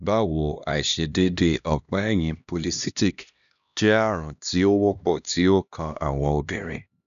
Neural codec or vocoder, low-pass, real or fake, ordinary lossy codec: codec, 16 kHz, 1 kbps, X-Codec, HuBERT features, trained on LibriSpeech; 7.2 kHz; fake; none